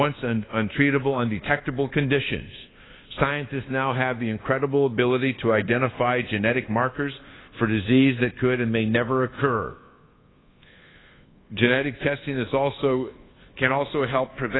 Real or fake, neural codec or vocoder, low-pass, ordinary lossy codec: fake; codec, 24 kHz, 1.2 kbps, DualCodec; 7.2 kHz; AAC, 16 kbps